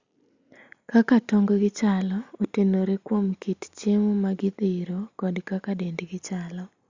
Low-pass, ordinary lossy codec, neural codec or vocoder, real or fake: 7.2 kHz; Opus, 64 kbps; none; real